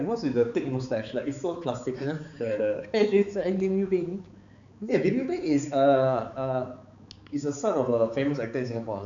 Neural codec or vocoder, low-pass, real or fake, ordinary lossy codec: codec, 16 kHz, 4 kbps, X-Codec, HuBERT features, trained on balanced general audio; 7.2 kHz; fake; none